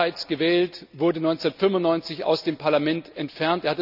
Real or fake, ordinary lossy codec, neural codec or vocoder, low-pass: real; none; none; 5.4 kHz